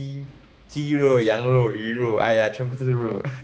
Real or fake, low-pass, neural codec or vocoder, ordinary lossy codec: fake; none; codec, 16 kHz, 2 kbps, X-Codec, HuBERT features, trained on balanced general audio; none